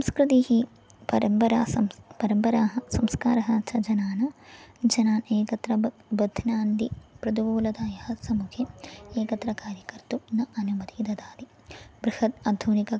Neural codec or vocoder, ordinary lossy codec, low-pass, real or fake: none; none; none; real